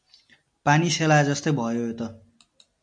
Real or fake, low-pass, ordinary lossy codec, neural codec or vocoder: real; 9.9 kHz; MP3, 48 kbps; none